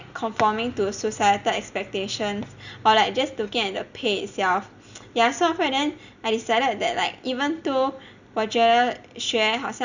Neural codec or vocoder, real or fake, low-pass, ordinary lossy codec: none; real; 7.2 kHz; none